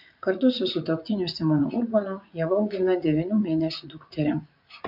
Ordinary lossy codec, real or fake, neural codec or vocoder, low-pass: MP3, 48 kbps; fake; vocoder, 44.1 kHz, 80 mel bands, Vocos; 5.4 kHz